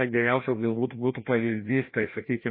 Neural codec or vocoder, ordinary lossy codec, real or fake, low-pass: codec, 16 kHz, 1 kbps, FreqCodec, larger model; MP3, 24 kbps; fake; 5.4 kHz